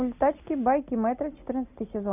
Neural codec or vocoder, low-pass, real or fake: none; 3.6 kHz; real